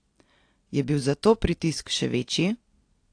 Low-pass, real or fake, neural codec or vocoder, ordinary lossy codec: 9.9 kHz; real; none; AAC, 48 kbps